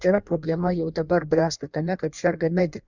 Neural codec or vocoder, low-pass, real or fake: codec, 16 kHz in and 24 kHz out, 1.1 kbps, FireRedTTS-2 codec; 7.2 kHz; fake